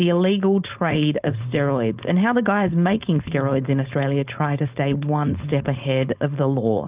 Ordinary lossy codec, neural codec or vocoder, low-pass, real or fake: Opus, 32 kbps; codec, 16 kHz, 4.8 kbps, FACodec; 3.6 kHz; fake